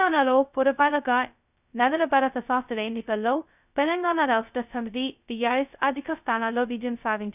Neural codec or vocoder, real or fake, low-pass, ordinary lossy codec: codec, 16 kHz, 0.2 kbps, FocalCodec; fake; 3.6 kHz; none